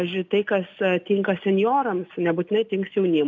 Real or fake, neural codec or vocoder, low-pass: real; none; 7.2 kHz